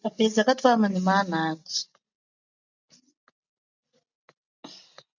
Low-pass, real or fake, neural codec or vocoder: 7.2 kHz; real; none